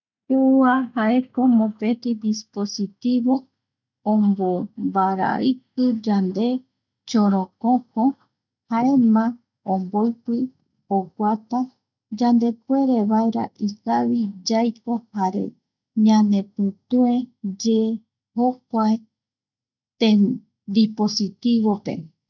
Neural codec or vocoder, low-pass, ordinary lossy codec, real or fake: none; 7.2 kHz; none; real